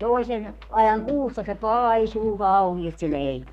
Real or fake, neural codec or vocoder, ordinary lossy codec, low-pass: fake; codec, 32 kHz, 1.9 kbps, SNAC; none; 14.4 kHz